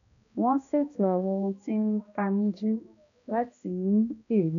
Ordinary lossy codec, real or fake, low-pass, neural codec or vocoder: none; fake; 7.2 kHz; codec, 16 kHz, 1 kbps, X-Codec, HuBERT features, trained on balanced general audio